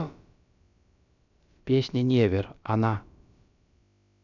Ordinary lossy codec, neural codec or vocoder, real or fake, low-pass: none; codec, 16 kHz, about 1 kbps, DyCAST, with the encoder's durations; fake; 7.2 kHz